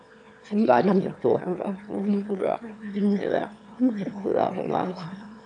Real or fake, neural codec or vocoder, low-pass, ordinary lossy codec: fake; autoencoder, 22.05 kHz, a latent of 192 numbers a frame, VITS, trained on one speaker; 9.9 kHz; AAC, 64 kbps